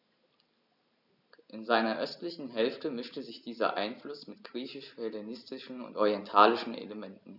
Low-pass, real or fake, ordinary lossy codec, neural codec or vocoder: 5.4 kHz; real; none; none